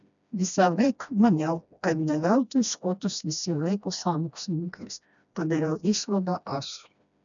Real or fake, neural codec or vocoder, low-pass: fake; codec, 16 kHz, 1 kbps, FreqCodec, smaller model; 7.2 kHz